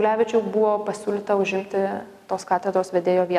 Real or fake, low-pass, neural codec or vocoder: real; 14.4 kHz; none